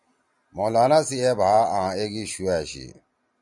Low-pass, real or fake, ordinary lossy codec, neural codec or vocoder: 10.8 kHz; real; MP3, 96 kbps; none